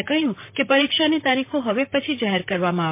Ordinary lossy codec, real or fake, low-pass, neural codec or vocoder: MP3, 24 kbps; fake; 3.6 kHz; vocoder, 44.1 kHz, 128 mel bands, Pupu-Vocoder